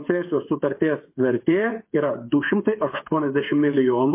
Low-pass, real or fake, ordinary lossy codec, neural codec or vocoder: 3.6 kHz; fake; MP3, 24 kbps; codec, 16 kHz, 8 kbps, FreqCodec, smaller model